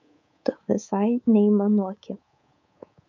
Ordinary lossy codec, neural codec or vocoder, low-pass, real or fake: MP3, 48 kbps; codec, 24 kHz, 3.1 kbps, DualCodec; 7.2 kHz; fake